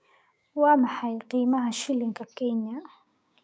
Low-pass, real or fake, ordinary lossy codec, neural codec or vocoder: none; fake; none; codec, 16 kHz, 6 kbps, DAC